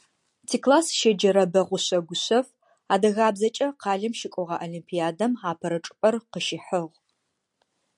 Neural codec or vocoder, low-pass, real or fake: none; 10.8 kHz; real